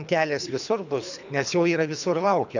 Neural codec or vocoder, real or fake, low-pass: codec, 24 kHz, 3 kbps, HILCodec; fake; 7.2 kHz